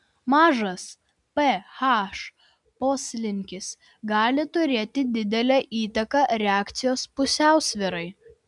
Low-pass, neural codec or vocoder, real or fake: 10.8 kHz; none; real